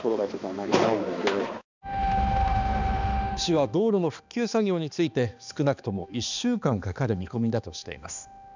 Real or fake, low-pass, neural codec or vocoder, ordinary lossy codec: fake; 7.2 kHz; codec, 16 kHz, 2 kbps, X-Codec, HuBERT features, trained on balanced general audio; none